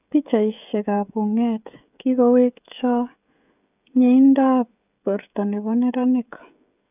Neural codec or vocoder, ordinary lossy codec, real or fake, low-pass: codec, 16 kHz, 16 kbps, FreqCodec, smaller model; none; fake; 3.6 kHz